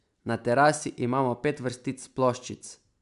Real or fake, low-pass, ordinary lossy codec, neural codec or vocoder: real; 10.8 kHz; MP3, 96 kbps; none